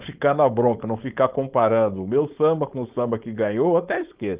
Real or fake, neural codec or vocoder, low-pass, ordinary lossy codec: fake; codec, 16 kHz, 4.8 kbps, FACodec; 3.6 kHz; Opus, 32 kbps